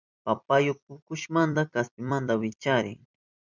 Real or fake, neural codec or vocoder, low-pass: fake; vocoder, 44.1 kHz, 128 mel bands every 256 samples, BigVGAN v2; 7.2 kHz